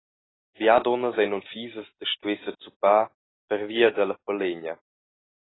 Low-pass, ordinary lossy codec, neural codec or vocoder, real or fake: 7.2 kHz; AAC, 16 kbps; none; real